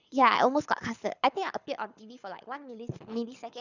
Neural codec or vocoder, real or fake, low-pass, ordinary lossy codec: codec, 24 kHz, 6 kbps, HILCodec; fake; 7.2 kHz; none